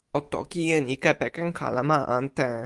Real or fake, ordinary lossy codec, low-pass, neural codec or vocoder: fake; Opus, 24 kbps; 10.8 kHz; autoencoder, 48 kHz, 128 numbers a frame, DAC-VAE, trained on Japanese speech